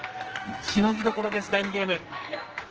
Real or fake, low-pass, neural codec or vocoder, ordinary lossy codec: fake; 7.2 kHz; codec, 44.1 kHz, 2.6 kbps, SNAC; Opus, 16 kbps